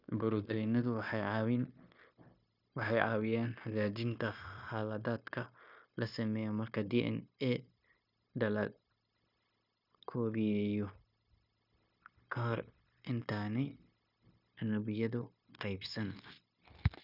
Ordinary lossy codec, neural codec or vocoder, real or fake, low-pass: none; codec, 16 kHz, 0.9 kbps, LongCat-Audio-Codec; fake; 5.4 kHz